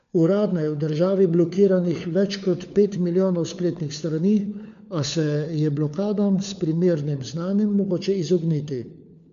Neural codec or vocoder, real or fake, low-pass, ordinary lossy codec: codec, 16 kHz, 4 kbps, FunCodec, trained on LibriTTS, 50 frames a second; fake; 7.2 kHz; none